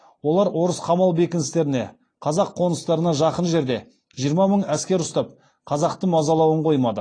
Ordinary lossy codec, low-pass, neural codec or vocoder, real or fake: AAC, 32 kbps; 9.9 kHz; none; real